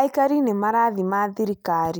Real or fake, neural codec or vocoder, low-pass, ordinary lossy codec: real; none; none; none